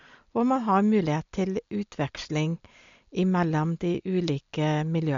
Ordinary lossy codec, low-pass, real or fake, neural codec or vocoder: MP3, 48 kbps; 7.2 kHz; real; none